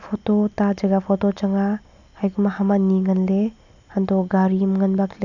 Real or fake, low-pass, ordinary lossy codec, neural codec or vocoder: real; 7.2 kHz; none; none